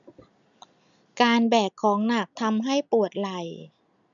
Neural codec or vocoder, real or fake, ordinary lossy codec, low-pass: none; real; none; 7.2 kHz